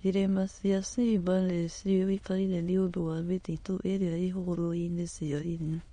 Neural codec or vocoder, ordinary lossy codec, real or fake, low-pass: autoencoder, 22.05 kHz, a latent of 192 numbers a frame, VITS, trained on many speakers; MP3, 48 kbps; fake; 9.9 kHz